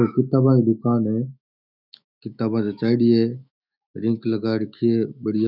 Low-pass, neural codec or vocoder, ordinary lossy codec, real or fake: 5.4 kHz; autoencoder, 48 kHz, 128 numbers a frame, DAC-VAE, trained on Japanese speech; none; fake